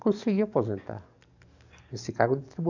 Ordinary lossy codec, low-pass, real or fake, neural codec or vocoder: none; 7.2 kHz; fake; codec, 44.1 kHz, 7.8 kbps, DAC